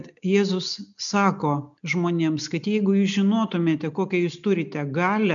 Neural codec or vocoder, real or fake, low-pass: none; real; 7.2 kHz